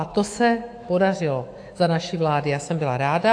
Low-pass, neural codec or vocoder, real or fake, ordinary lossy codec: 9.9 kHz; autoencoder, 48 kHz, 128 numbers a frame, DAC-VAE, trained on Japanese speech; fake; AAC, 48 kbps